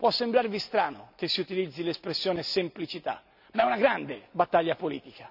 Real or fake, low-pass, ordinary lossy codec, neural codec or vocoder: real; 5.4 kHz; none; none